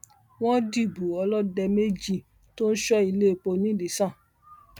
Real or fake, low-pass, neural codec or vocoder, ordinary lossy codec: real; 19.8 kHz; none; none